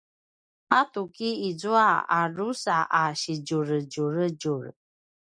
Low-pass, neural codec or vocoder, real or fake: 9.9 kHz; none; real